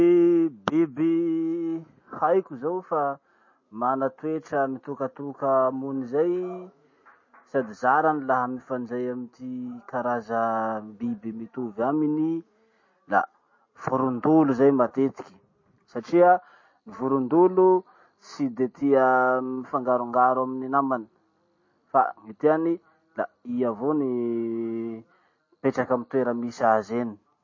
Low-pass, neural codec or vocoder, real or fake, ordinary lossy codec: 7.2 kHz; none; real; MP3, 32 kbps